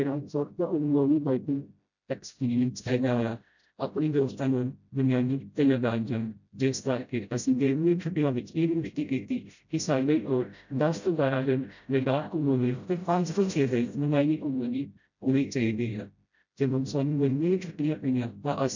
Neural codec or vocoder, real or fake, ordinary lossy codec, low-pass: codec, 16 kHz, 0.5 kbps, FreqCodec, smaller model; fake; none; 7.2 kHz